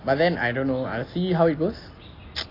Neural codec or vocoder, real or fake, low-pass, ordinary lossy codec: none; real; 5.4 kHz; AAC, 24 kbps